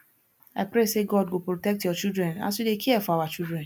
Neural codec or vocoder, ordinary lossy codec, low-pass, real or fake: none; none; none; real